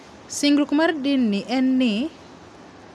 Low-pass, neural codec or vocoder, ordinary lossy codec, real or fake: none; none; none; real